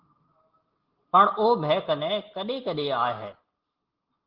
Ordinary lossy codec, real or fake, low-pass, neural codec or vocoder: Opus, 16 kbps; real; 5.4 kHz; none